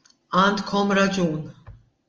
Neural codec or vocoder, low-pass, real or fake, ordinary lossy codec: none; 7.2 kHz; real; Opus, 24 kbps